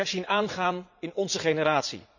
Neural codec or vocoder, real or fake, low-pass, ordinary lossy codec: vocoder, 44.1 kHz, 80 mel bands, Vocos; fake; 7.2 kHz; none